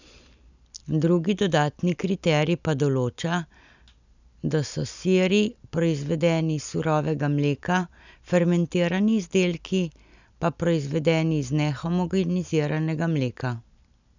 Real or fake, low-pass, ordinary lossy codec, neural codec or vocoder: real; 7.2 kHz; none; none